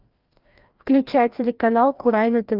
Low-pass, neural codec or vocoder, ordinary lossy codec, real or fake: 5.4 kHz; codec, 16 kHz, 1 kbps, FreqCodec, larger model; Opus, 24 kbps; fake